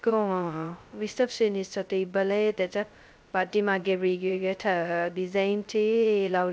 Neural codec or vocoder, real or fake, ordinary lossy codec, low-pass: codec, 16 kHz, 0.2 kbps, FocalCodec; fake; none; none